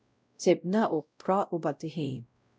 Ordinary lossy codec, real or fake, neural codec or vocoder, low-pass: none; fake; codec, 16 kHz, 0.5 kbps, X-Codec, WavLM features, trained on Multilingual LibriSpeech; none